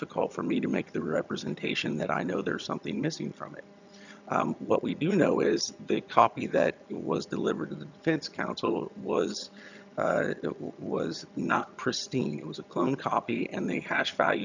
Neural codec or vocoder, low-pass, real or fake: vocoder, 22.05 kHz, 80 mel bands, HiFi-GAN; 7.2 kHz; fake